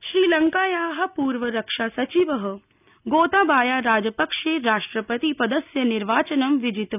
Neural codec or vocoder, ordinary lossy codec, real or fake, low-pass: none; none; real; 3.6 kHz